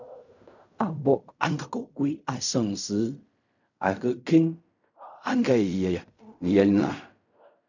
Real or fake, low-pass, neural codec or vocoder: fake; 7.2 kHz; codec, 16 kHz in and 24 kHz out, 0.4 kbps, LongCat-Audio-Codec, fine tuned four codebook decoder